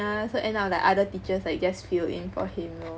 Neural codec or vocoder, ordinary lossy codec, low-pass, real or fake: none; none; none; real